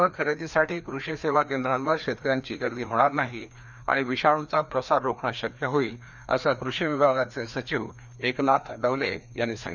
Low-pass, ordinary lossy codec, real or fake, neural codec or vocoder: 7.2 kHz; none; fake; codec, 16 kHz, 2 kbps, FreqCodec, larger model